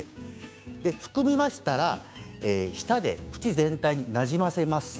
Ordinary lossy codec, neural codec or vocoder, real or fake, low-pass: none; codec, 16 kHz, 6 kbps, DAC; fake; none